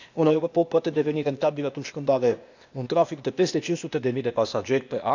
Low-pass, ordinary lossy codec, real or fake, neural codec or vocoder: 7.2 kHz; none; fake; codec, 16 kHz, 0.8 kbps, ZipCodec